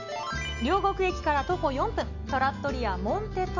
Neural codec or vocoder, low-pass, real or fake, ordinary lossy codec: none; 7.2 kHz; real; none